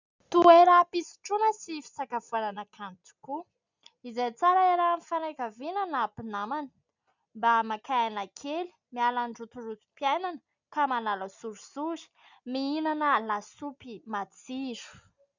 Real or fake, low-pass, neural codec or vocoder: real; 7.2 kHz; none